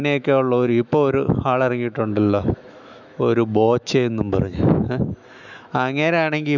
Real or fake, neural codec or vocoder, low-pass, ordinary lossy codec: fake; autoencoder, 48 kHz, 128 numbers a frame, DAC-VAE, trained on Japanese speech; 7.2 kHz; none